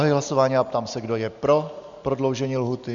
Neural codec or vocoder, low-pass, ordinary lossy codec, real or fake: none; 7.2 kHz; Opus, 64 kbps; real